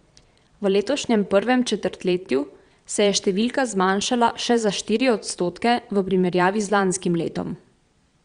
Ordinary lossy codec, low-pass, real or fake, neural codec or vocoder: Opus, 64 kbps; 9.9 kHz; fake; vocoder, 22.05 kHz, 80 mel bands, Vocos